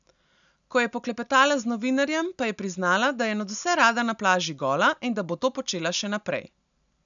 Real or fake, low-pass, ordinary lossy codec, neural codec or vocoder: real; 7.2 kHz; none; none